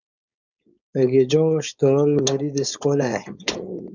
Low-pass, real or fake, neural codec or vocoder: 7.2 kHz; fake; codec, 16 kHz, 4.8 kbps, FACodec